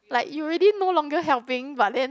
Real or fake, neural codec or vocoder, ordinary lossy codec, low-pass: real; none; none; none